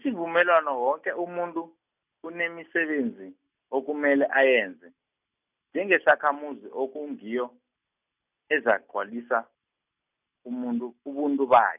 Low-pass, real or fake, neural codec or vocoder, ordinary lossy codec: 3.6 kHz; real; none; none